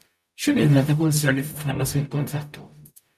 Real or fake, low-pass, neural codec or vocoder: fake; 14.4 kHz; codec, 44.1 kHz, 0.9 kbps, DAC